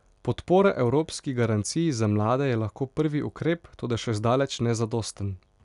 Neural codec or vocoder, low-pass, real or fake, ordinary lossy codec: none; 10.8 kHz; real; none